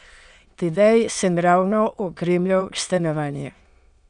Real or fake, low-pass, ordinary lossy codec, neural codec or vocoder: fake; 9.9 kHz; none; autoencoder, 22.05 kHz, a latent of 192 numbers a frame, VITS, trained on many speakers